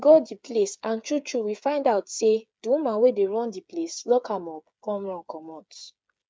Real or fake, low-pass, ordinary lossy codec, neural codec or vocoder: fake; none; none; codec, 16 kHz, 8 kbps, FreqCodec, smaller model